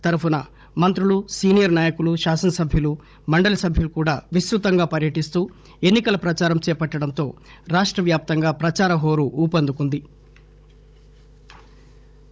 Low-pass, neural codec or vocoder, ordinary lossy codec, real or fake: none; codec, 16 kHz, 16 kbps, FunCodec, trained on Chinese and English, 50 frames a second; none; fake